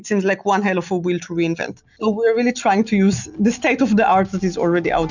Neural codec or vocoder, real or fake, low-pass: none; real; 7.2 kHz